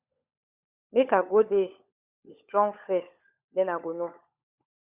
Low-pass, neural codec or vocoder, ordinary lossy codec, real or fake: 3.6 kHz; codec, 16 kHz, 16 kbps, FunCodec, trained on LibriTTS, 50 frames a second; Opus, 64 kbps; fake